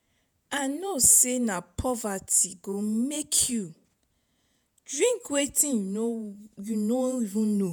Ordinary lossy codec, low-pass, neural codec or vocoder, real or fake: none; none; vocoder, 48 kHz, 128 mel bands, Vocos; fake